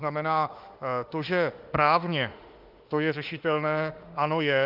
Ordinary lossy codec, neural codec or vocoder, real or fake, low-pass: Opus, 32 kbps; autoencoder, 48 kHz, 32 numbers a frame, DAC-VAE, trained on Japanese speech; fake; 5.4 kHz